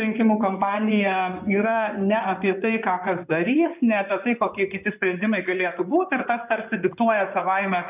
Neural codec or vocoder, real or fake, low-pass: codec, 16 kHz in and 24 kHz out, 2.2 kbps, FireRedTTS-2 codec; fake; 3.6 kHz